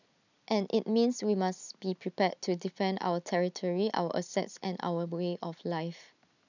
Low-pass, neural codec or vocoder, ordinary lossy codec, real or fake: 7.2 kHz; none; none; real